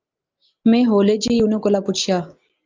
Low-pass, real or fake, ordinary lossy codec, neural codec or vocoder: 7.2 kHz; real; Opus, 24 kbps; none